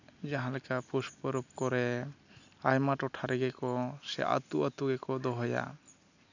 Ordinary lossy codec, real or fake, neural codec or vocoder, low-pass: none; real; none; 7.2 kHz